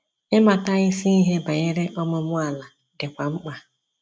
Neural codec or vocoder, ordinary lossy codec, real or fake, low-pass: none; none; real; none